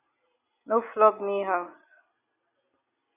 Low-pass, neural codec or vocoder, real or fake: 3.6 kHz; none; real